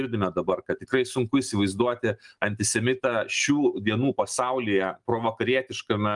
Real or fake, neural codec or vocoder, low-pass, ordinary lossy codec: real; none; 10.8 kHz; Opus, 32 kbps